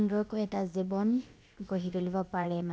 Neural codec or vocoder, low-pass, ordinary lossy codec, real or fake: codec, 16 kHz, about 1 kbps, DyCAST, with the encoder's durations; none; none; fake